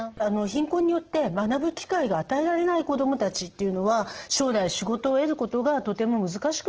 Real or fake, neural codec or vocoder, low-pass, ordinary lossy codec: real; none; 7.2 kHz; Opus, 16 kbps